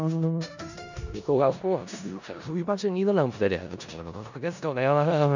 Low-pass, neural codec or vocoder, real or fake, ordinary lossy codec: 7.2 kHz; codec, 16 kHz in and 24 kHz out, 0.4 kbps, LongCat-Audio-Codec, four codebook decoder; fake; none